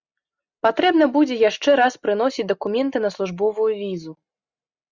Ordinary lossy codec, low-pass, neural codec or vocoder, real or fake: Opus, 64 kbps; 7.2 kHz; none; real